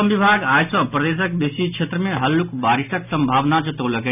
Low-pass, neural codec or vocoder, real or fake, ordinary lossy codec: 3.6 kHz; none; real; none